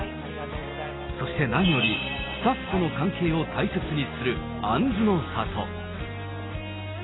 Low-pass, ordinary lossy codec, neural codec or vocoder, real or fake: 7.2 kHz; AAC, 16 kbps; none; real